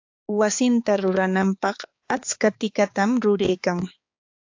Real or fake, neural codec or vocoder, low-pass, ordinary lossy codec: fake; codec, 16 kHz, 4 kbps, X-Codec, HuBERT features, trained on balanced general audio; 7.2 kHz; AAC, 48 kbps